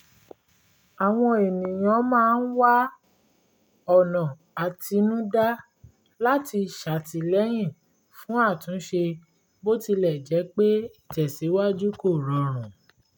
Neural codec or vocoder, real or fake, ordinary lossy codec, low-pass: none; real; none; none